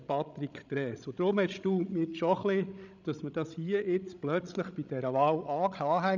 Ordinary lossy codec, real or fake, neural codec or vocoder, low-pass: none; fake; codec, 16 kHz, 16 kbps, FreqCodec, larger model; 7.2 kHz